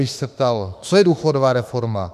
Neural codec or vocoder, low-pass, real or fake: autoencoder, 48 kHz, 32 numbers a frame, DAC-VAE, trained on Japanese speech; 14.4 kHz; fake